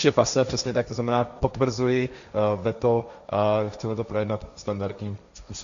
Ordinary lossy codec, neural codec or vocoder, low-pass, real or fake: Opus, 64 kbps; codec, 16 kHz, 1.1 kbps, Voila-Tokenizer; 7.2 kHz; fake